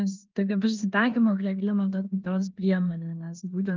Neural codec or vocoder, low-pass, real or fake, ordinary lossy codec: codec, 16 kHz in and 24 kHz out, 0.9 kbps, LongCat-Audio-Codec, four codebook decoder; 7.2 kHz; fake; Opus, 32 kbps